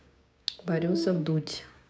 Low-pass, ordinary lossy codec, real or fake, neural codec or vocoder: none; none; fake; codec, 16 kHz, 6 kbps, DAC